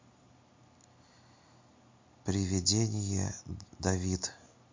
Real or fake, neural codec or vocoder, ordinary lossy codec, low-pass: real; none; MP3, 48 kbps; 7.2 kHz